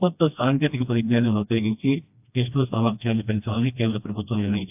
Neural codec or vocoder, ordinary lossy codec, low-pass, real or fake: codec, 16 kHz, 1 kbps, FreqCodec, smaller model; AAC, 32 kbps; 3.6 kHz; fake